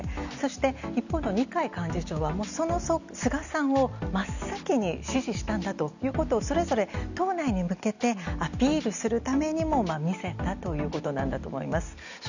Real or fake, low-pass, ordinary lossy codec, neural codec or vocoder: real; 7.2 kHz; none; none